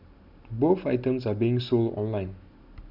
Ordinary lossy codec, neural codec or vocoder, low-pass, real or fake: none; none; 5.4 kHz; real